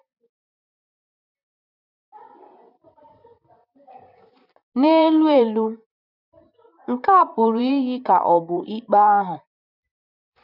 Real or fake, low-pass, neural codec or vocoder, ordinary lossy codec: fake; 5.4 kHz; vocoder, 44.1 kHz, 128 mel bands, Pupu-Vocoder; none